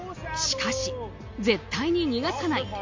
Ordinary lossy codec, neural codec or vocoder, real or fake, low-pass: MP3, 48 kbps; none; real; 7.2 kHz